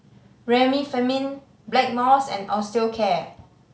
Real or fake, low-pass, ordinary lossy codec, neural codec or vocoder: real; none; none; none